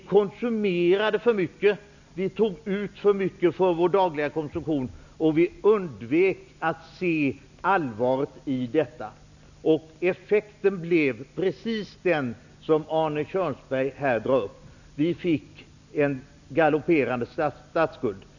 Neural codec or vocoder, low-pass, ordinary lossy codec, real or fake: none; 7.2 kHz; none; real